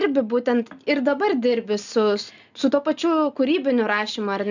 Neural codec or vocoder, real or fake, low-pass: none; real; 7.2 kHz